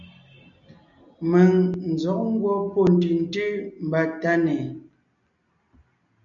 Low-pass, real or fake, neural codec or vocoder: 7.2 kHz; real; none